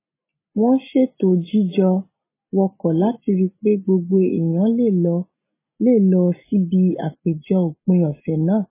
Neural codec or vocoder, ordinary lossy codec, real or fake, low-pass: none; MP3, 16 kbps; real; 3.6 kHz